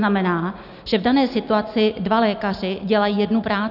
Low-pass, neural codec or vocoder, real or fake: 5.4 kHz; none; real